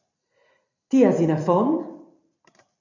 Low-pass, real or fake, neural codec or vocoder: 7.2 kHz; fake; vocoder, 44.1 kHz, 128 mel bands every 256 samples, BigVGAN v2